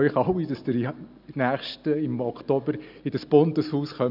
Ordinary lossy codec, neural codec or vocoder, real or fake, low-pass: none; none; real; 5.4 kHz